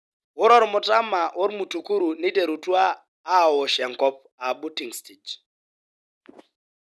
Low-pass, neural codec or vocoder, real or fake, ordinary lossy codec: none; none; real; none